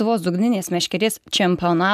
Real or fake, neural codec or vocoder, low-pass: real; none; 14.4 kHz